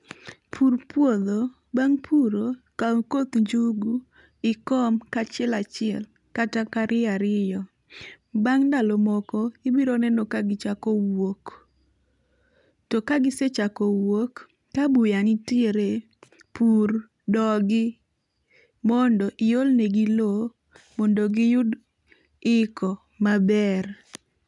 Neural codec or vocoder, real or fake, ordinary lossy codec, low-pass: none; real; none; 10.8 kHz